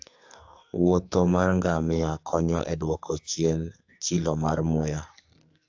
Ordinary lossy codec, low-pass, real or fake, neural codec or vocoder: AAC, 48 kbps; 7.2 kHz; fake; codec, 44.1 kHz, 2.6 kbps, SNAC